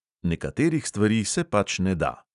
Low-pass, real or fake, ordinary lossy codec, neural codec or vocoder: 10.8 kHz; real; none; none